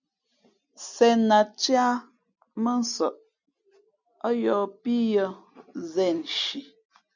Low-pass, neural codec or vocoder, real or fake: 7.2 kHz; none; real